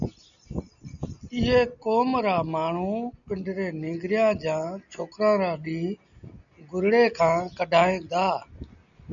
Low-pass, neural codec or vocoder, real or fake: 7.2 kHz; none; real